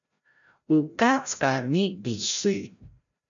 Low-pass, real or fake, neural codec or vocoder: 7.2 kHz; fake; codec, 16 kHz, 0.5 kbps, FreqCodec, larger model